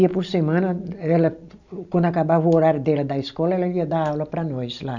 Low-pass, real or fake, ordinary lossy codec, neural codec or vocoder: 7.2 kHz; real; none; none